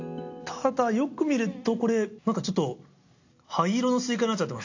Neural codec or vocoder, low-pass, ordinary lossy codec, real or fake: none; 7.2 kHz; AAC, 48 kbps; real